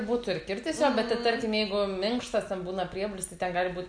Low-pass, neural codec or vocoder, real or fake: 9.9 kHz; none; real